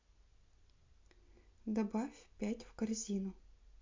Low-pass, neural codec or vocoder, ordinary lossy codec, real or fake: 7.2 kHz; none; MP3, 48 kbps; real